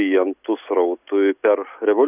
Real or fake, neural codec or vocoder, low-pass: real; none; 3.6 kHz